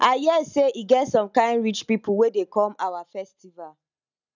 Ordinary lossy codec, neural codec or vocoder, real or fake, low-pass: none; none; real; 7.2 kHz